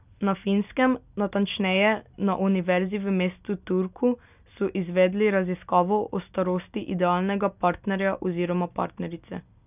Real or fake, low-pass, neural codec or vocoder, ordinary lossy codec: real; 3.6 kHz; none; none